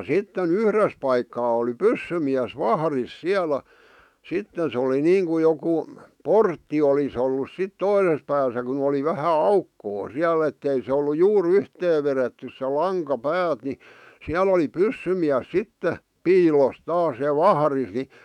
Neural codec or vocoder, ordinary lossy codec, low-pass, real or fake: autoencoder, 48 kHz, 128 numbers a frame, DAC-VAE, trained on Japanese speech; none; 19.8 kHz; fake